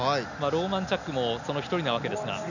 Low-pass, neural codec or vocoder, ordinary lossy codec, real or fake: 7.2 kHz; none; none; real